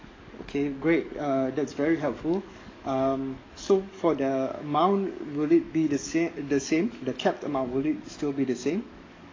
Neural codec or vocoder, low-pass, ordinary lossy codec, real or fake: codec, 44.1 kHz, 7.8 kbps, DAC; 7.2 kHz; AAC, 32 kbps; fake